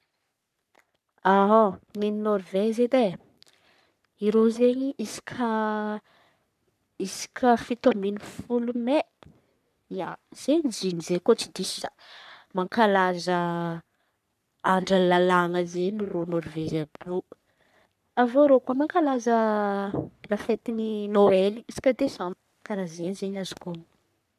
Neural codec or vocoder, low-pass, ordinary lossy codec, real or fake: codec, 44.1 kHz, 3.4 kbps, Pupu-Codec; 14.4 kHz; none; fake